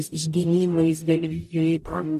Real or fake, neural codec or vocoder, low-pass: fake; codec, 44.1 kHz, 0.9 kbps, DAC; 14.4 kHz